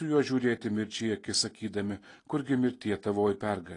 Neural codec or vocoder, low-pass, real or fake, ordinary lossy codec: none; 10.8 kHz; real; AAC, 32 kbps